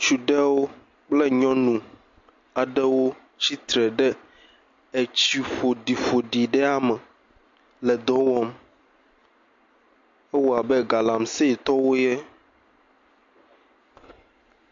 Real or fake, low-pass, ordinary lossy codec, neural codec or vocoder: real; 7.2 kHz; MP3, 48 kbps; none